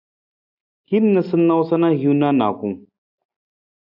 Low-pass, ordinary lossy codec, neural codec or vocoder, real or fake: 5.4 kHz; AAC, 48 kbps; none; real